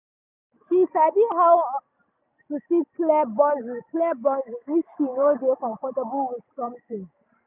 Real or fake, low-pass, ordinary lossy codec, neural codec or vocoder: real; 3.6 kHz; none; none